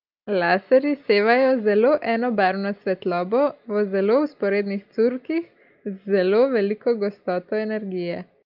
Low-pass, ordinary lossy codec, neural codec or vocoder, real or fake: 5.4 kHz; Opus, 24 kbps; none; real